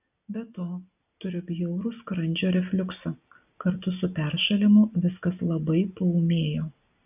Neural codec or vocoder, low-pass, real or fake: none; 3.6 kHz; real